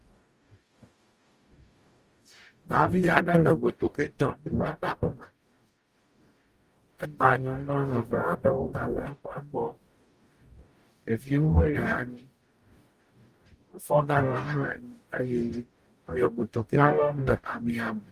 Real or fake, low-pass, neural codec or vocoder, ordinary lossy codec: fake; 14.4 kHz; codec, 44.1 kHz, 0.9 kbps, DAC; Opus, 32 kbps